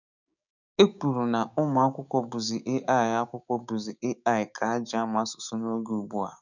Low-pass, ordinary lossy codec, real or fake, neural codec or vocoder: 7.2 kHz; none; fake; codec, 16 kHz, 6 kbps, DAC